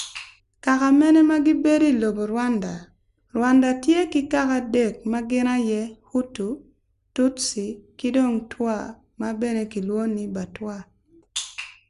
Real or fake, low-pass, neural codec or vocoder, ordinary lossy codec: real; 10.8 kHz; none; Opus, 64 kbps